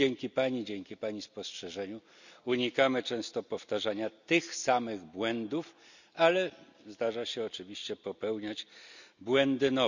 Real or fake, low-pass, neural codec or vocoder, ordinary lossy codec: real; 7.2 kHz; none; none